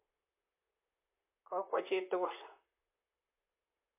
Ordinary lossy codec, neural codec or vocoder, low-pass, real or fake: MP3, 24 kbps; vocoder, 44.1 kHz, 128 mel bands, Pupu-Vocoder; 3.6 kHz; fake